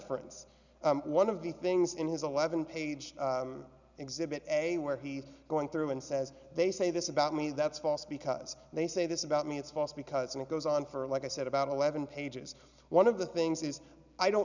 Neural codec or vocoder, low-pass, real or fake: none; 7.2 kHz; real